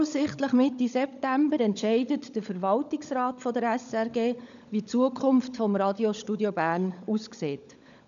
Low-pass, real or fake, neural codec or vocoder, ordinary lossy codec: 7.2 kHz; fake; codec, 16 kHz, 16 kbps, FunCodec, trained on LibriTTS, 50 frames a second; none